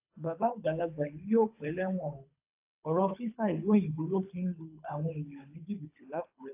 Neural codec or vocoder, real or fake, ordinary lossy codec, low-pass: codec, 24 kHz, 3 kbps, HILCodec; fake; AAC, 24 kbps; 3.6 kHz